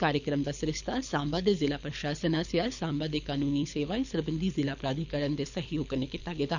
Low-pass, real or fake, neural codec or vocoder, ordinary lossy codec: 7.2 kHz; fake; codec, 24 kHz, 6 kbps, HILCodec; none